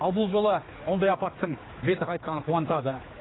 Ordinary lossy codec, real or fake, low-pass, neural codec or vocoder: AAC, 16 kbps; fake; 7.2 kHz; codec, 16 kHz, 4 kbps, X-Codec, HuBERT features, trained on general audio